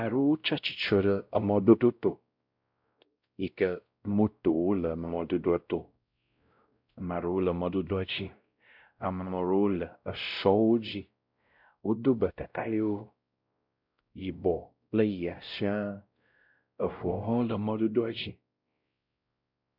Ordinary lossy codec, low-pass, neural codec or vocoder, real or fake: MP3, 48 kbps; 5.4 kHz; codec, 16 kHz, 0.5 kbps, X-Codec, WavLM features, trained on Multilingual LibriSpeech; fake